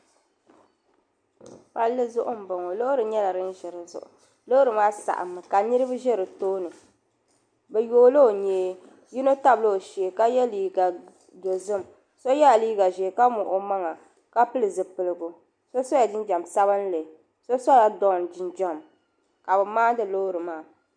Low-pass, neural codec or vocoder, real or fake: 9.9 kHz; none; real